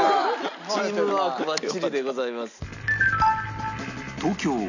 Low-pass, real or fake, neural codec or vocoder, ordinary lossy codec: 7.2 kHz; real; none; none